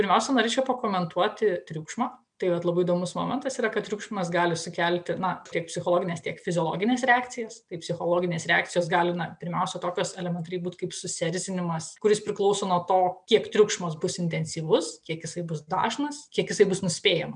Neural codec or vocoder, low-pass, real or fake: none; 9.9 kHz; real